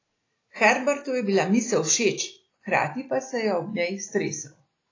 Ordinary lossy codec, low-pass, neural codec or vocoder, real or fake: AAC, 32 kbps; 7.2 kHz; none; real